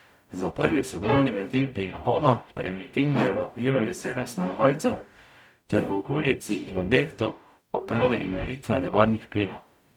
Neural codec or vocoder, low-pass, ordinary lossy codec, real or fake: codec, 44.1 kHz, 0.9 kbps, DAC; 19.8 kHz; none; fake